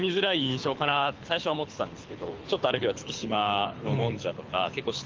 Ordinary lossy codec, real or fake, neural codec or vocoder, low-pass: Opus, 24 kbps; fake; codec, 24 kHz, 6 kbps, HILCodec; 7.2 kHz